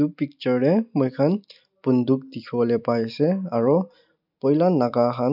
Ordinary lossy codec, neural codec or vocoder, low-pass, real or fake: none; none; 5.4 kHz; real